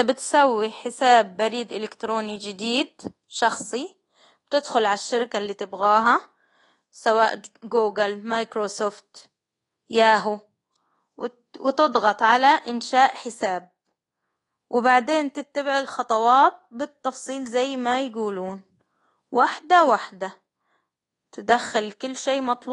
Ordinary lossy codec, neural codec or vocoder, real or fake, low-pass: AAC, 32 kbps; codec, 24 kHz, 1.2 kbps, DualCodec; fake; 10.8 kHz